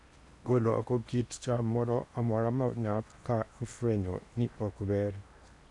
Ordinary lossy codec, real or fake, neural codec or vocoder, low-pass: none; fake; codec, 16 kHz in and 24 kHz out, 0.8 kbps, FocalCodec, streaming, 65536 codes; 10.8 kHz